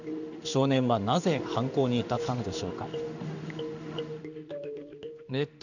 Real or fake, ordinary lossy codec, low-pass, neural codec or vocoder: fake; none; 7.2 kHz; codec, 16 kHz in and 24 kHz out, 1 kbps, XY-Tokenizer